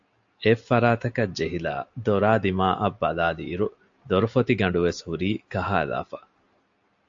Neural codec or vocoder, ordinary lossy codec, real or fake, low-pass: none; AAC, 64 kbps; real; 7.2 kHz